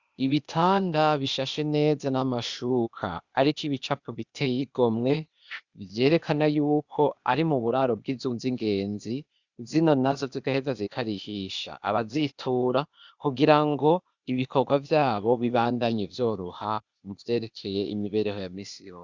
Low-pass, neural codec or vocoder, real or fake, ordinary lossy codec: 7.2 kHz; codec, 16 kHz, 0.8 kbps, ZipCodec; fake; Opus, 64 kbps